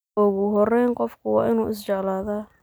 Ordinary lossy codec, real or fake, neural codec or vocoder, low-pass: none; real; none; none